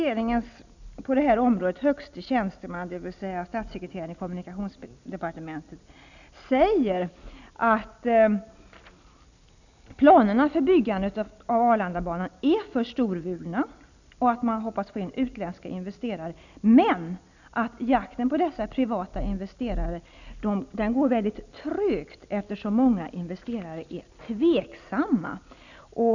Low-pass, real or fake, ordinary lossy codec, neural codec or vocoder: 7.2 kHz; real; none; none